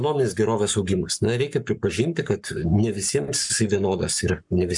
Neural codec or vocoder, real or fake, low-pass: codec, 44.1 kHz, 7.8 kbps, Pupu-Codec; fake; 10.8 kHz